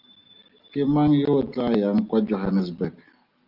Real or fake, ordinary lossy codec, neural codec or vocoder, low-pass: real; Opus, 24 kbps; none; 5.4 kHz